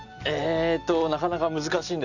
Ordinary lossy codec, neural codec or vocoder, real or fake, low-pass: none; none; real; 7.2 kHz